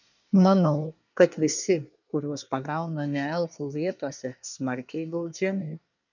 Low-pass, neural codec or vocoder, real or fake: 7.2 kHz; codec, 24 kHz, 1 kbps, SNAC; fake